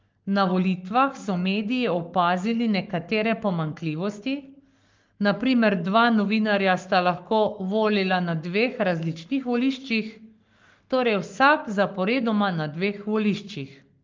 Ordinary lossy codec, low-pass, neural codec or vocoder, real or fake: Opus, 32 kbps; 7.2 kHz; codec, 44.1 kHz, 7.8 kbps, Pupu-Codec; fake